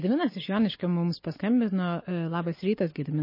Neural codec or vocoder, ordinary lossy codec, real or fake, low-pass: none; MP3, 24 kbps; real; 5.4 kHz